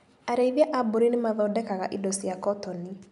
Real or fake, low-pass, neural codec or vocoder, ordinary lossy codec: real; 10.8 kHz; none; none